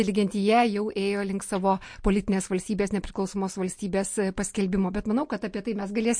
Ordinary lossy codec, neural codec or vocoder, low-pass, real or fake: MP3, 48 kbps; none; 9.9 kHz; real